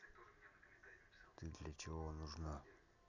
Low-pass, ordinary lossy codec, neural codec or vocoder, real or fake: 7.2 kHz; none; none; real